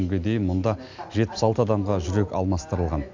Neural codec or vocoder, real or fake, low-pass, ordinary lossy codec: none; real; 7.2 kHz; MP3, 64 kbps